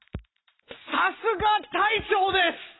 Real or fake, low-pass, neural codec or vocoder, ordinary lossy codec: real; 7.2 kHz; none; AAC, 16 kbps